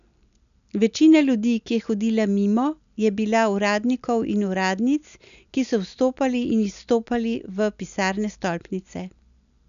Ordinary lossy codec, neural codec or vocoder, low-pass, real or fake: none; none; 7.2 kHz; real